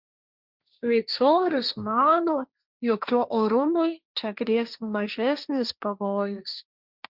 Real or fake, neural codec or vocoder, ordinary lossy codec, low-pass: fake; codec, 16 kHz, 1.1 kbps, Voila-Tokenizer; Opus, 64 kbps; 5.4 kHz